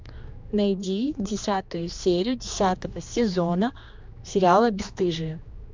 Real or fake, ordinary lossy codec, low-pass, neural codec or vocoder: fake; MP3, 64 kbps; 7.2 kHz; codec, 16 kHz, 2 kbps, X-Codec, HuBERT features, trained on general audio